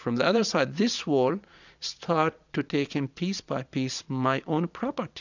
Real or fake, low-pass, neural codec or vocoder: fake; 7.2 kHz; vocoder, 22.05 kHz, 80 mel bands, Vocos